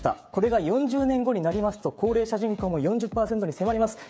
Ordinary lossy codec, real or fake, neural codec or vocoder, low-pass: none; fake; codec, 16 kHz, 16 kbps, FreqCodec, smaller model; none